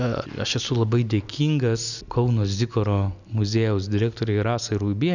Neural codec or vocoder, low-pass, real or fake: none; 7.2 kHz; real